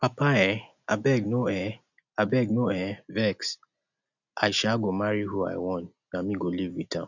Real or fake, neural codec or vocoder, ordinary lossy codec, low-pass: real; none; none; 7.2 kHz